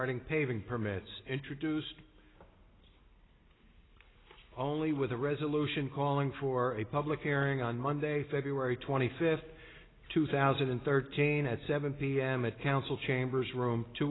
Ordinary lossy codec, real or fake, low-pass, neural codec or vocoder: AAC, 16 kbps; real; 7.2 kHz; none